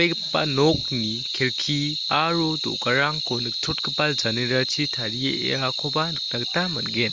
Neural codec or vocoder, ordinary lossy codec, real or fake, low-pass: none; Opus, 32 kbps; real; 7.2 kHz